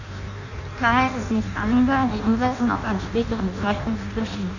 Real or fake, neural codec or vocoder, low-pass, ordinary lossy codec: fake; codec, 16 kHz in and 24 kHz out, 0.6 kbps, FireRedTTS-2 codec; 7.2 kHz; none